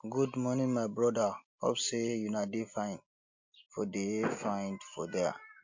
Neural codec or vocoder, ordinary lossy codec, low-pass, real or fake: none; MP3, 48 kbps; 7.2 kHz; real